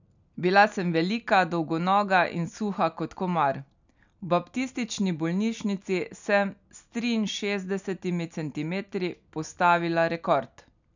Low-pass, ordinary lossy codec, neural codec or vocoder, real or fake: 7.2 kHz; none; none; real